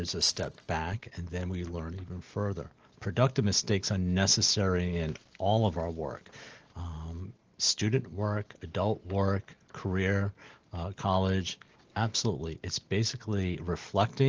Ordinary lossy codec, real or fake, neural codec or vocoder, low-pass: Opus, 16 kbps; real; none; 7.2 kHz